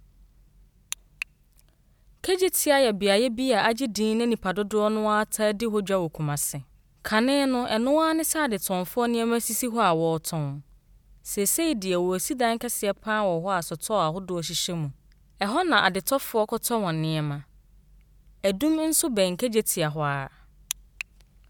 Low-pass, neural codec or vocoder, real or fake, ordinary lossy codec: none; none; real; none